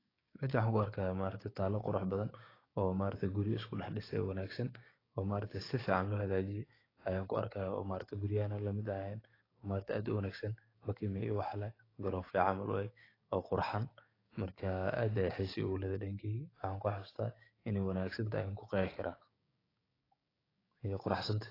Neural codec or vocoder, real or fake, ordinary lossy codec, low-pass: codec, 16 kHz, 6 kbps, DAC; fake; AAC, 24 kbps; 5.4 kHz